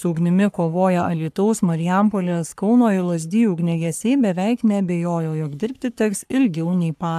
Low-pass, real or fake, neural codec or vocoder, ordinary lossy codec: 14.4 kHz; fake; codec, 44.1 kHz, 3.4 kbps, Pupu-Codec; AAC, 96 kbps